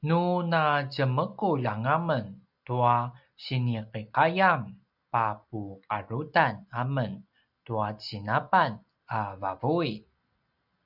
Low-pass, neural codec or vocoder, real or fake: 5.4 kHz; none; real